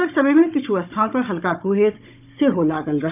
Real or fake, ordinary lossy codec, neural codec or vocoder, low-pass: fake; none; codec, 16 kHz, 16 kbps, FunCodec, trained on Chinese and English, 50 frames a second; 3.6 kHz